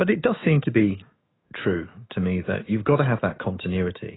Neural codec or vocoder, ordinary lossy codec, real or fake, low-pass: autoencoder, 48 kHz, 128 numbers a frame, DAC-VAE, trained on Japanese speech; AAC, 16 kbps; fake; 7.2 kHz